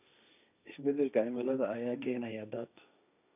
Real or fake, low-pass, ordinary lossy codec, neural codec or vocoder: fake; 3.6 kHz; none; codec, 16 kHz, 1.1 kbps, Voila-Tokenizer